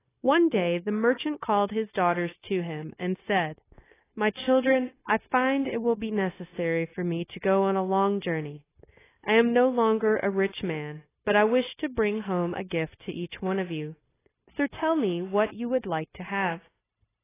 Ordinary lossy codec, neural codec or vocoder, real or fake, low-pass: AAC, 16 kbps; codec, 16 kHz, 0.9 kbps, LongCat-Audio-Codec; fake; 3.6 kHz